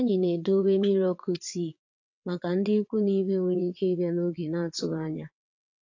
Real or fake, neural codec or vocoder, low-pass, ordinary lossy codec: fake; vocoder, 22.05 kHz, 80 mel bands, WaveNeXt; 7.2 kHz; AAC, 48 kbps